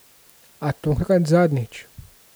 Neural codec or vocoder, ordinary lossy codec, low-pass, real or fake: none; none; none; real